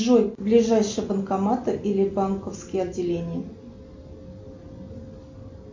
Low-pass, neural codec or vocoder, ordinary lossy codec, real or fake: 7.2 kHz; none; MP3, 48 kbps; real